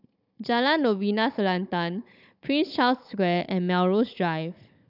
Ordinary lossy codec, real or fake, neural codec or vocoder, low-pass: none; fake; codec, 16 kHz, 16 kbps, FunCodec, trained on Chinese and English, 50 frames a second; 5.4 kHz